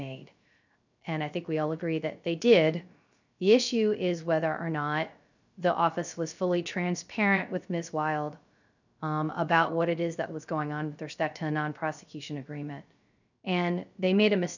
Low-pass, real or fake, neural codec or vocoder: 7.2 kHz; fake; codec, 16 kHz, 0.3 kbps, FocalCodec